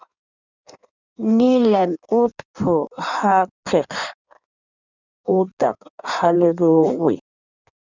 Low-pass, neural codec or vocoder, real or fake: 7.2 kHz; codec, 16 kHz in and 24 kHz out, 1.1 kbps, FireRedTTS-2 codec; fake